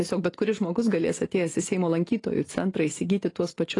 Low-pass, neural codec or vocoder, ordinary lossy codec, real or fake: 10.8 kHz; none; AAC, 32 kbps; real